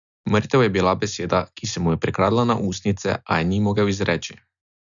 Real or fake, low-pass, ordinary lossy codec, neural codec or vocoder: real; 7.2 kHz; none; none